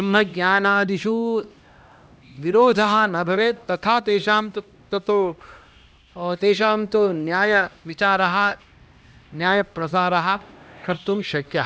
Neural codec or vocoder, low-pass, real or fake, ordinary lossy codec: codec, 16 kHz, 1 kbps, X-Codec, HuBERT features, trained on LibriSpeech; none; fake; none